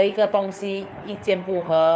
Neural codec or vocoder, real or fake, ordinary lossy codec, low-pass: codec, 16 kHz, 4 kbps, FunCodec, trained on LibriTTS, 50 frames a second; fake; none; none